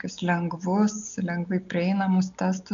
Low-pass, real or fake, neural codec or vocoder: 7.2 kHz; real; none